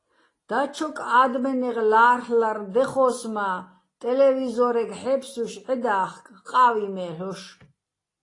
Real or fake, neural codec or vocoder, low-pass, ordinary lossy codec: real; none; 10.8 kHz; AAC, 32 kbps